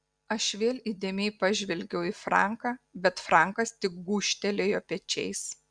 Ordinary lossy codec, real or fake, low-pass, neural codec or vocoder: Opus, 64 kbps; real; 9.9 kHz; none